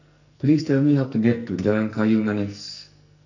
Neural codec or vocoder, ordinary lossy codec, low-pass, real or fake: codec, 44.1 kHz, 2.6 kbps, SNAC; none; 7.2 kHz; fake